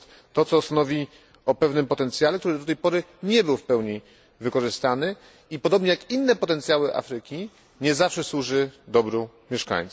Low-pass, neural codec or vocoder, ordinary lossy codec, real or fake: none; none; none; real